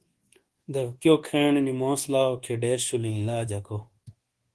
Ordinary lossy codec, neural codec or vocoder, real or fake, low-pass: Opus, 16 kbps; codec, 24 kHz, 1.2 kbps, DualCodec; fake; 10.8 kHz